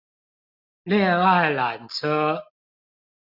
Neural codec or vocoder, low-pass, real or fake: none; 5.4 kHz; real